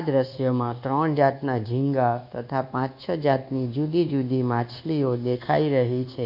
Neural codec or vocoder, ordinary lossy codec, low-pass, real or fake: codec, 24 kHz, 1.2 kbps, DualCodec; none; 5.4 kHz; fake